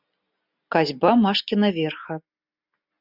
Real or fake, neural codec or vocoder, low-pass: real; none; 5.4 kHz